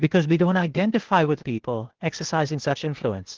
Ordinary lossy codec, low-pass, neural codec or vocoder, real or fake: Opus, 16 kbps; 7.2 kHz; codec, 16 kHz, 0.8 kbps, ZipCodec; fake